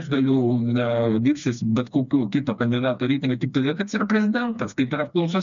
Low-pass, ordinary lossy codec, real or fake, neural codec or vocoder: 7.2 kHz; MP3, 96 kbps; fake; codec, 16 kHz, 2 kbps, FreqCodec, smaller model